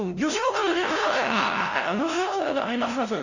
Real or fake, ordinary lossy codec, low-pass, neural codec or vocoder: fake; none; 7.2 kHz; codec, 16 kHz, 0.5 kbps, FunCodec, trained on LibriTTS, 25 frames a second